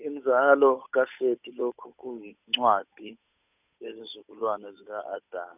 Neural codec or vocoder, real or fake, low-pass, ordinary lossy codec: codec, 16 kHz, 8 kbps, FunCodec, trained on Chinese and English, 25 frames a second; fake; 3.6 kHz; none